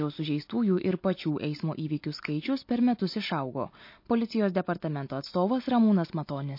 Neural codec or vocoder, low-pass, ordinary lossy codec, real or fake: none; 5.4 kHz; MP3, 32 kbps; real